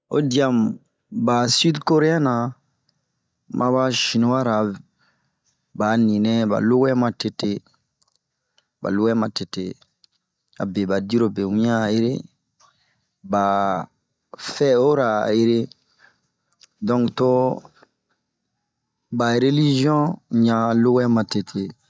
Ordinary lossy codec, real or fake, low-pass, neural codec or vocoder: none; real; none; none